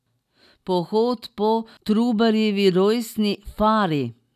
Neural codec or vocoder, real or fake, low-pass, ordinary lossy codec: none; real; 14.4 kHz; none